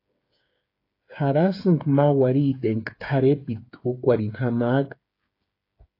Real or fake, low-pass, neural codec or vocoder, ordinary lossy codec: fake; 5.4 kHz; codec, 16 kHz, 8 kbps, FreqCodec, smaller model; AAC, 32 kbps